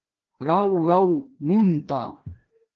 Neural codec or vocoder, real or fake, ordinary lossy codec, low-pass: codec, 16 kHz, 1 kbps, FreqCodec, larger model; fake; Opus, 16 kbps; 7.2 kHz